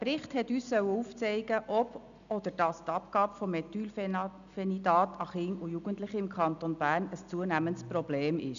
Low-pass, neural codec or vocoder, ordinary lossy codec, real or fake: 7.2 kHz; none; none; real